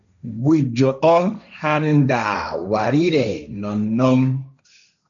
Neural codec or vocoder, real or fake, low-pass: codec, 16 kHz, 1.1 kbps, Voila-Tokenizer; fake; 7.2 kHz